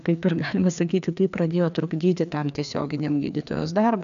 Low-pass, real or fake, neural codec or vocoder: 7.2 kHz; fake; codec, 16 kHz, 2 kbps, FreqCodec, larger model